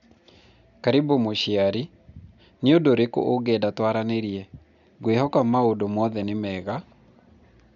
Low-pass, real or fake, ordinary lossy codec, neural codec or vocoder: 7.2 kHz; real; none; none